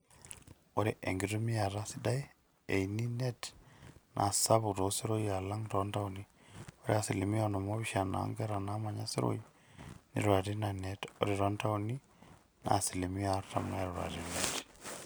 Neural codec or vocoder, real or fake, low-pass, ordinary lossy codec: none; real; none; none